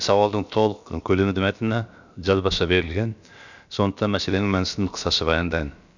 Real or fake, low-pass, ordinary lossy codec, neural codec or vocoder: fake; 7.2 kHz; none; codec, 16 kHz, about 1 kbps, DyCAST, with the encoder's durations